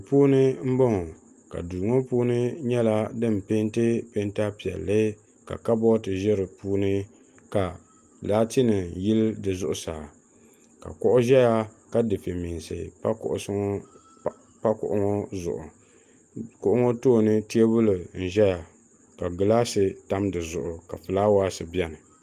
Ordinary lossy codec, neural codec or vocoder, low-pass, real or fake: Opus, 32 kbps; none; 14.4 kHz; real